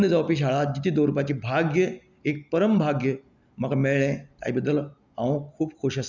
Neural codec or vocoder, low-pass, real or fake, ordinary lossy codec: none; 7.2 kHz; real; none